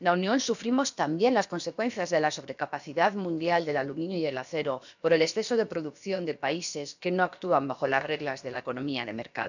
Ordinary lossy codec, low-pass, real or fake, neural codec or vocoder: none; 7.2 kHz; fake; codec, 16 kHz, about 1 kbps, DyCAST, with the encoder's durations